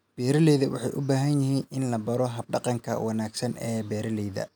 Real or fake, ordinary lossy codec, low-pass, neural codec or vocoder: real; none; none; none